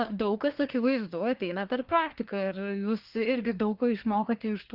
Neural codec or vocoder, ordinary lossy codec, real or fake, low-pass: codec, 24 kHz, 1 kbps, SNAC; Opus, 24 kbps; fake; 5.4 kHz